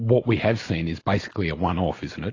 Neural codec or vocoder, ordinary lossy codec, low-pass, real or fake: none; AAC, 32 kbps; 7.2 kHz; real